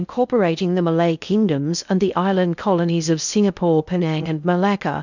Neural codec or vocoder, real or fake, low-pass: codec, 16 kHz in and 24 kHz out, 0.6 kbps, FocalCodec, streaming, 2048 codes; fake; 7.2 kHz